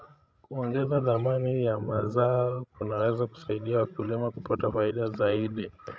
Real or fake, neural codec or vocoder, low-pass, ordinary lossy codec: fake; codec, 16 kHz, 16 kbps, FreqCodec, larger model; none; none